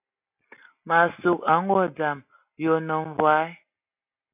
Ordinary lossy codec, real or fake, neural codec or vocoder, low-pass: AAC, 32 kbps; real; none; 3.6 kHz